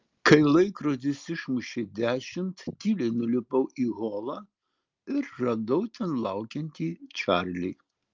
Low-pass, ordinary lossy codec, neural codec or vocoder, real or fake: 7.2 kHz; Opus, 32 kbps; none; real